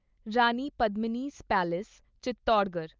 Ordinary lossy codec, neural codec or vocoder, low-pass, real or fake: Opus, 24 kbps; none; 7.2 kHz; real